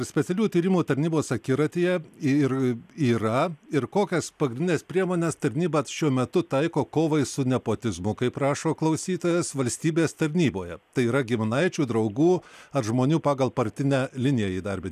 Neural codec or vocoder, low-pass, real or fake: none; 14.4 kHz; real